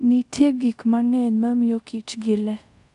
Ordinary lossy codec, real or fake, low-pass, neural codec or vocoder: none; fake; 10.8 kHz; codec, 24 kHz, 0.5 kbps, DualCodec